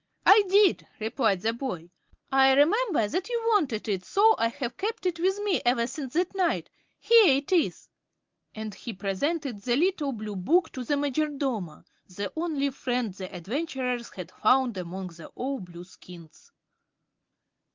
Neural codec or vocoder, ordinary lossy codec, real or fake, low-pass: none; Opus, 24 kbps; real; 7.2 kHz